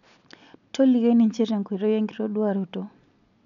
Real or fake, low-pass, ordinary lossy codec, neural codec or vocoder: real; 7.2 kHz; none; none